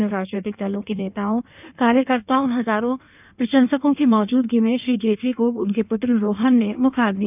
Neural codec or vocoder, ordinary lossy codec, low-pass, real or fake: codec, 16 kHz in and 24 kHz out, 1.1 kbps, FireRedTTS-2 codec; none; 3.6 kHz; fake